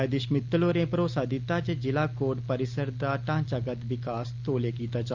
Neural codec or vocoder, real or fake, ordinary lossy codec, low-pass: none; real; Opus, 24 kbps; 7.2 kHz